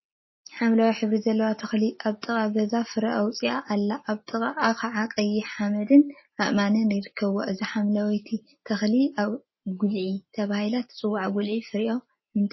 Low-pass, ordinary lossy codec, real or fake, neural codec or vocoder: 7.2 kHz; MP3, 24 kbps; real; none